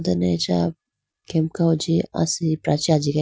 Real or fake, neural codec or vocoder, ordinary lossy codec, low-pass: real; none; none; none